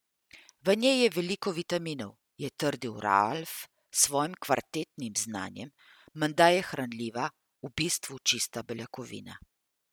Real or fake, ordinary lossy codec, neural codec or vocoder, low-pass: real; none; none; none